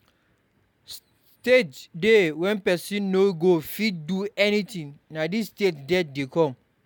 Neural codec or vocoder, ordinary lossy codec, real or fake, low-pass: none; none; real; 19.8 kHz